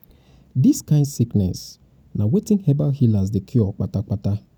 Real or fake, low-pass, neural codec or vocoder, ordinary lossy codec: real; 19.8 kHz; none; none